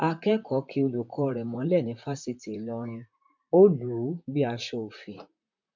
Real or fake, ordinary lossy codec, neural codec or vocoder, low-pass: fake; MP3, 64 kbps; vocoder, 44.1 kHz, 128 mel bands, Pupu-Vocoder; 7.2 kHz